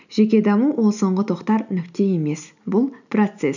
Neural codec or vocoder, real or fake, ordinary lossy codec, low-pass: none; real; none; 7.2 kHz